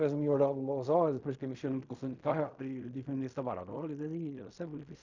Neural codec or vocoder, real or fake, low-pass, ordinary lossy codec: codec, 16 kHz in and 24 kHz out, 0.4 kbps, LongCat-Audio-Codec, fine tuned four codebook decoder; fake; 7.2 kHz; Opus, 64 kbps